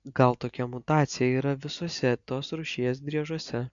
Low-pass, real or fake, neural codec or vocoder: 7.2 kHz; real; none